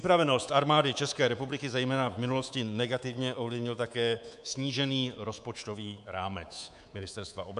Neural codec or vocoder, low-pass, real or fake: codec, 24 kHz, 3.1 kbps, DualCodec; 10.8 kHz; fake